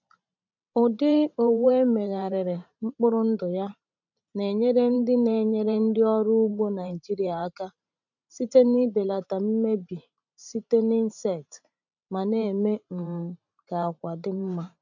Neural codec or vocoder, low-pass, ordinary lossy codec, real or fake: vocoder, 44.1 kHz, 128 mel bands every 512 samples, BigVGAN v2; 7.2 kHz; none; fake